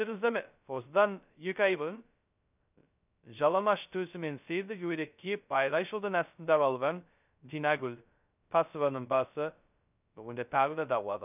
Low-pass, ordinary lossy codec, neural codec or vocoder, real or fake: 3.6 kHz; none; codec, 16 kHz, 0.2 kbps, FocalCodec; fake